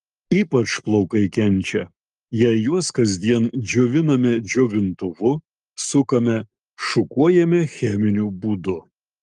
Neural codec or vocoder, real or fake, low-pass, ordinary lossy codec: codec, 44.1 kHz, 7.8 kbps, Pupu-Codec; fake; 10.8 kHz; Opus, 32 kbps